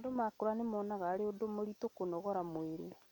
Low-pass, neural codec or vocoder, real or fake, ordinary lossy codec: 19.8 kHz; none; real; none